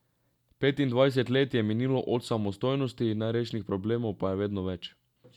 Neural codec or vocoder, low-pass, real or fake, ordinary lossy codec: none; 19.8 kHz; real; none